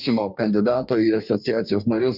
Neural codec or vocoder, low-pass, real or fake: codec, 44.1 kHz, 2.6 kbps, DAC; 5.4 kHz; fake